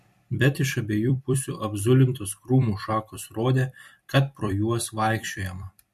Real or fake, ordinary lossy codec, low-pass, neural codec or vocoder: fake; MP3, 64 kbps; 14.4 kHz; vocoder, 44.1 kHz, 128 mel bands every 256 samples, BigVGAN v2